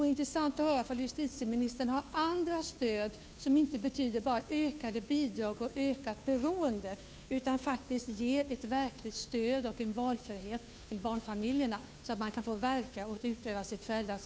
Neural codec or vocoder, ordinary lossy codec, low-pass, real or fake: codec, 16 kHz, 2 kbps, FunCodec, trained on Chinese and English, 25 frames a second; none; none; fake